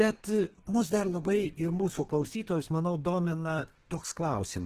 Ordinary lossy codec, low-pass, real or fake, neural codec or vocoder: Opus, 16 kbps; 14.4 kHz; fake; codec, 32 kHz, 1.9 kbps, SNAC